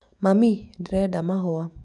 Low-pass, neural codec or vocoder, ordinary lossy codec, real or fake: 10.8 kHz; autoencoder, 48 kHz, 128 numbers a frame, DAC-VAE, trained on Japanese speech; none; fake